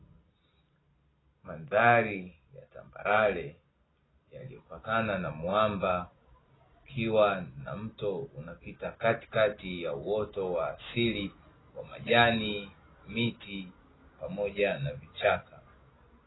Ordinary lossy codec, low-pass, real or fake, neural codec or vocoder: AAC, 16 kbps; 7.2 kHz; real; none